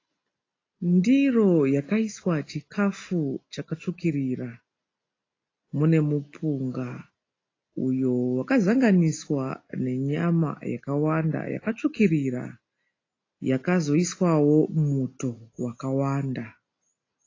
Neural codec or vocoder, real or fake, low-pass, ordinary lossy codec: none; real; 7.2 kHz; AAC, 32 kbps